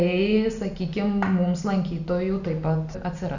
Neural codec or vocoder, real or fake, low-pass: none; real; 7.2 kHz